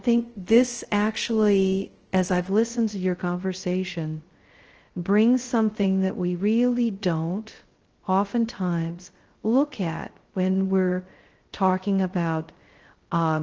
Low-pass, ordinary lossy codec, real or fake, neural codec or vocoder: 7.2 kHz; Opus, 16 kbps; fake; codec, 16 kHz, 0.2 kbps, FocalCodec